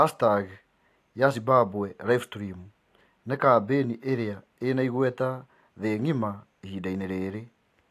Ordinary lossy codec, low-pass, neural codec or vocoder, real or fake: AAC, 64 kbps; 14.4 kHz; none; real